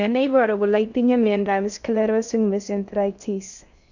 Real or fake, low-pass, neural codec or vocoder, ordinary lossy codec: fake; 7.2 kHz; codec, 16 kHz in and 24 kHz out, 0.6 kbps, FocalCodec, streaming, 2048 codes; none